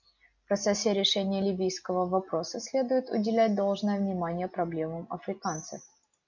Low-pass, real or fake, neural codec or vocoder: 7.2 kHz; real; none